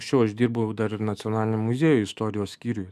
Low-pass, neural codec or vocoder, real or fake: 14.4 kHz; autoencoder, 48 kHz, 128 numbers a frame, DAC-VAE, trained on Japanese speech; fake